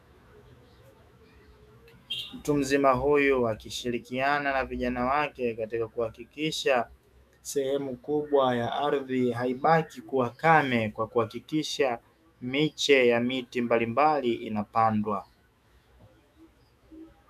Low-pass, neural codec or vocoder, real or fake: 14.4 kHz; autoencoder, 48 kHz, 128 numbers a frame, DAC-VAE, trained on Japanese speech; fake